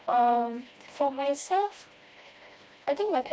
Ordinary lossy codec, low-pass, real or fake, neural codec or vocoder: none; none; fake; codec, 16 kHz, 1 kbps, FreqCodec, smaller model